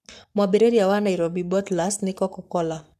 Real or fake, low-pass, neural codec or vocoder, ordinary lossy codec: fake; 14.4 kHz; codec, 44.1 kHz, 7.8 kbps, Pupu-Codec; none